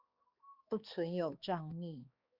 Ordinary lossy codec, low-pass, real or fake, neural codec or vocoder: Opus, 64 kbps; 5.4 kHz; fake; codec, 16 kHz, 2 kbps, X-Codec, HuBERT features, trained on balanced general audio